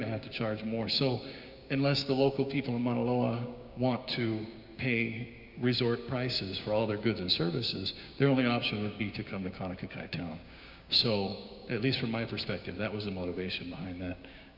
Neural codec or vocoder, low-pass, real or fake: codec, 16 kHz, 6 kbps, DAC; 5.4 kHz; fake